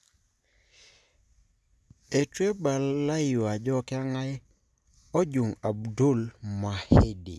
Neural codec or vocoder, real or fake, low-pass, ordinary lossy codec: none; real; none; none